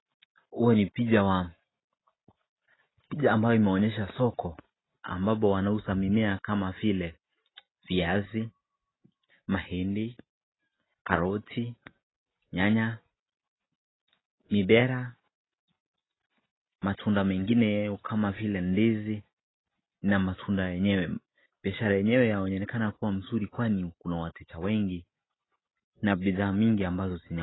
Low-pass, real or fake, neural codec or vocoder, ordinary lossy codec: 7.2 kHz; real; none; AAC, 16 kbps